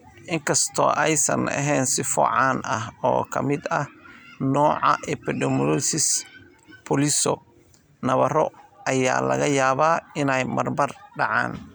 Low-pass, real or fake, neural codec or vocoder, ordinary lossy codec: none; real; none; none